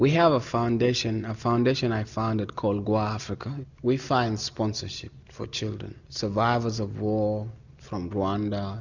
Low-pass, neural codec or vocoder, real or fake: 7.2 kHz; none; real